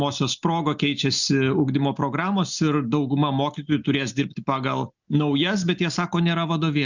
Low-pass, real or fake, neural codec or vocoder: 7.2 kHz; real; none